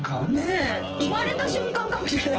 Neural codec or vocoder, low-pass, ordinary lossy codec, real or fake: vocoder, 24 kHz, 100 mel bands, Vocos; 7.2 kHz; Opus, 16 kbps; fake